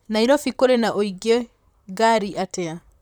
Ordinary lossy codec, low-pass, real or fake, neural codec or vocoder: none; 19.8 kHz; fake; vocoder, 44.1 kHz, 128 mel bands, Pupu-Vocoder